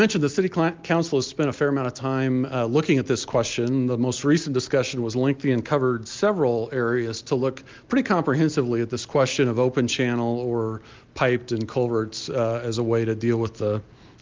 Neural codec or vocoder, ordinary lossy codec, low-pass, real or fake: none; Opus, 32 kbps; 7.2 kHz; real